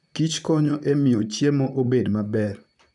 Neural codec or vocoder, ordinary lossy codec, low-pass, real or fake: vocoder, 44.1 kHz, 128 mel bands, Pupu-Vocoder; none; 10.8 kHz; fake